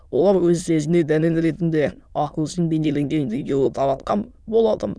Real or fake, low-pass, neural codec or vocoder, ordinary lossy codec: fake; none; autoencoder, 22.05 kHz, a latent of 192 numbers a frame, VITS, trained on many speakers; none